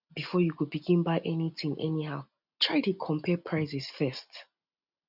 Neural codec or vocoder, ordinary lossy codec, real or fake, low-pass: none; none; real; 5.4 kHz